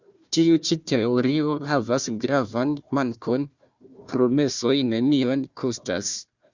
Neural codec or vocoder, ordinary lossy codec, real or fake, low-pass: codec, 16 kHz, 1 kbps, FunCodec, trained on Chinese and English, 50 frames a second; Opus, 64 kbps; fake; 7.2 kHz